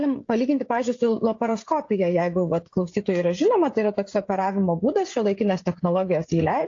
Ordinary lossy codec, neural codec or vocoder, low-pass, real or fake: AAC, 48 kbps; codec, 16 kHz, 16 kbps, FreqCodec, smaller model; 7.2 kHz; fake